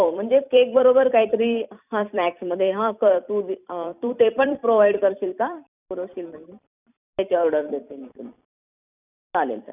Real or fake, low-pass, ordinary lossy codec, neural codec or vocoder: fake; 3.6 kHz; none; vocoder, 44.1 kHz, 128 mel bands every 256 samples, BigVGAN v2